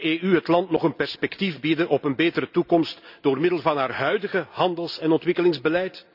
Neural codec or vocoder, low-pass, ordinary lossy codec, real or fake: none; 5.4 kHz; none; real